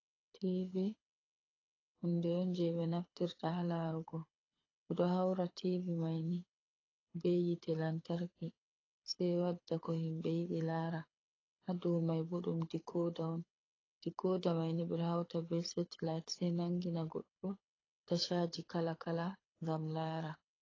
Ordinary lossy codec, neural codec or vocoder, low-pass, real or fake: AAC, 32 kbps; codec, 24 kHz, 6 kbps, HILCodec; 7.2 kHz; fake